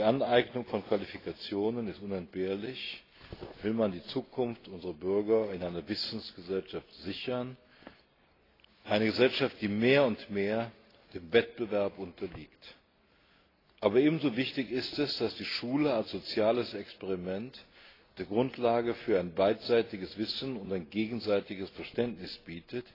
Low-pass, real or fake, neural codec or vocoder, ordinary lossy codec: 5.4 kHz; real; none; AAC, 24 kbps